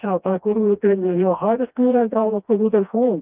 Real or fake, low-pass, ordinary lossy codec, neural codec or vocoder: fake; 3.6 kHz; Opus, 24 kbps; codec, 16 kHz, 1 kbps, FreqCodec, smaller model